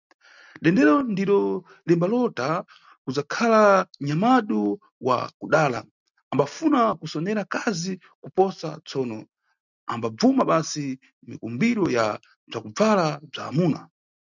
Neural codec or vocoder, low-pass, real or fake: none; 7.2 kHz; real